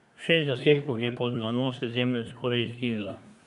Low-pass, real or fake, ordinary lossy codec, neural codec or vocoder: 10.8 kHz; fake; MP3, 96 kbps; codec, 24 kHz, 1 kbps, SNAC